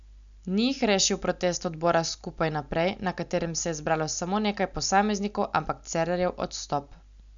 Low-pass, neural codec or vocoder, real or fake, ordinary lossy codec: 7.2 kHz; none; real; none